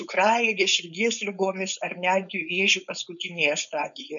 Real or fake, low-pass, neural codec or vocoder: fake; 7.2 kHz; codec, 16 kHz, 4.8 kbps, FACodec